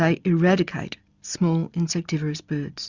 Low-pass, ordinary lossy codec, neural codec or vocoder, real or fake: 7.2 kHz; Opus, 64 kbps; none; real